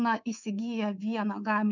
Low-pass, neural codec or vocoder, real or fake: 7.2 kHz; codec, 24 kHz, 3.1 kbps, DualCodec; fake